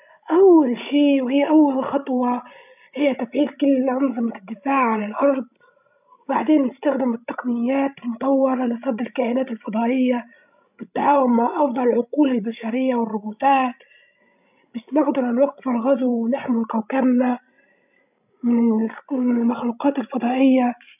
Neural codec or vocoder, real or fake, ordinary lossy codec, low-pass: codec, 16 kHz, 16 kbps, FreqCodec, larger model; fake; none; 3.6 kHz